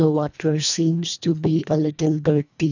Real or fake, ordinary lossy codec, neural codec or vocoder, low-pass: fake; none; codec, 24 kHz, 1.5 kbps, HILCodec; 7.2 kHz